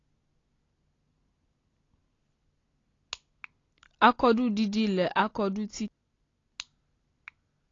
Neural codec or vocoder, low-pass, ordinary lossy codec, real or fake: none; 7.2 kHz; AAC, 32 kbps; real